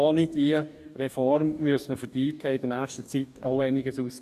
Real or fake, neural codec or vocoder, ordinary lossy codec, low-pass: fake; codec, 44.1 kHz, 2.6 kbps, DAC; none; 14.4 kHz